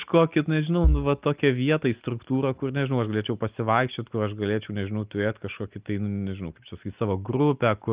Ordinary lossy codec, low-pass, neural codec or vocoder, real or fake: Opus, 32 kbps; 3.6 kHz; none; real